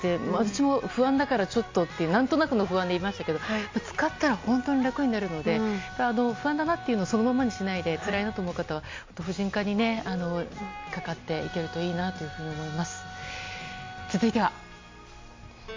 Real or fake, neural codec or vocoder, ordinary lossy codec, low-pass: real; none; MP3, 48 kbps; 7.2 kHz